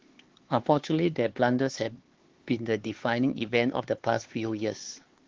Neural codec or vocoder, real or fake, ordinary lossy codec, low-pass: codec, 16 kHz, 4 kbps, X-Codec, HuBERT features, trained on LibriSpeech; fake; Opus, 16 kbps; 7.2 kHz